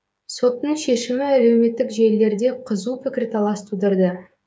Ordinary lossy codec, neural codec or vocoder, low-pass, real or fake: none; codec, 16 kHz, 16 kbps, FreqCodec, smaller model; none; fake